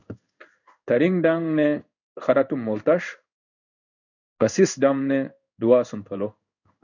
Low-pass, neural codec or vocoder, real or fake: 7.2 kHz; codec, 16 kHz in and 24 kHz out, 1 kbps, XY-Tokenizer; fake